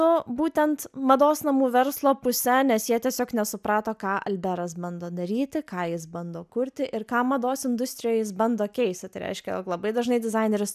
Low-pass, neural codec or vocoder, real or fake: 14.4 kHz; none; real